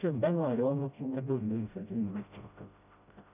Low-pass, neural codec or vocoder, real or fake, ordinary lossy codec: 3.6 kHz; codec, 16 kHz, 0.5 kbps, FreqCodec, smaller model; fake; none